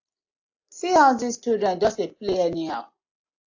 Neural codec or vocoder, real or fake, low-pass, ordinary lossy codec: none; real; 7.2 kHz; AAC, 48 kbps